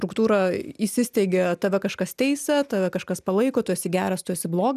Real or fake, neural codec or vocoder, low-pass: real; none; 14.4 kHz